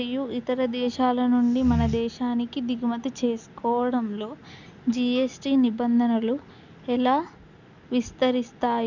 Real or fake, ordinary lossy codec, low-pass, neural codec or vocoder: real; none; 7.2 kHz; none